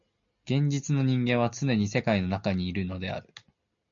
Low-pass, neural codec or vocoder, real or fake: 7.2 kHz; none; real